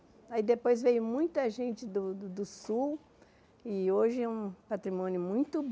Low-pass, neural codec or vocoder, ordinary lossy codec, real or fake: none; none; none; real